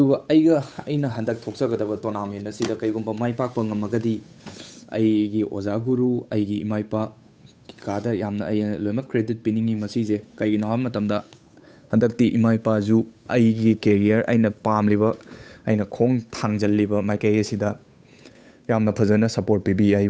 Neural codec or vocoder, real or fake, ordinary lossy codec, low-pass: codec, 16 kHz, 8 kbps, FunCodec, trained on Chinese and English, 25 frames a second; fake; none; none